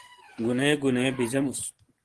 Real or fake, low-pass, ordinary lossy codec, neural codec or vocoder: real; 10.8 kHz; Opus, 16 kbps; none